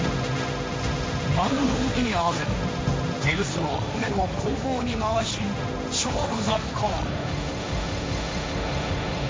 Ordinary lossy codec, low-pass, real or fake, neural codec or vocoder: none; none; fake; codec, 16 kHz, 1.1 kbps, Voila-Tokenizer